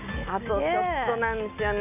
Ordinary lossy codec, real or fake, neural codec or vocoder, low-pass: none; real; none; 3.6 kHz